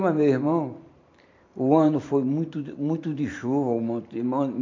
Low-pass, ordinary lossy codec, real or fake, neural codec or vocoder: 7.2 kHz; MP3, 48 kbps; fake; vocoder, 44.1 kHz, 128 mel bands every 512 samples, BigVGAN v2